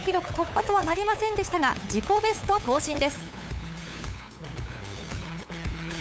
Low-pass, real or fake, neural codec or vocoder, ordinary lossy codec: none; fake; codec, 16 kHz, 8 kbps, FunCodec, trained on LibriTTS, 25 frames a second; none